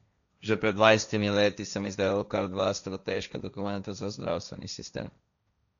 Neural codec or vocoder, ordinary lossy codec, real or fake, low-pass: codec, 16 kHz, 1.1 kbps, Voila-Tokenizer; none; fake; 7.2 kHz